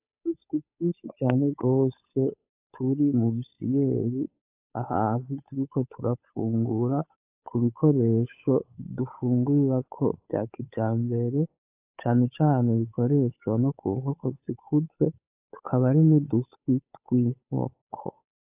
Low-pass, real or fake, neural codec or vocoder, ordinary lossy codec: 3.6 kHz; fake; codec, 16 kHz, 8 kbps, FunCodec, trained on Chinese and English, 25 frames a second; AAC, 32 kbps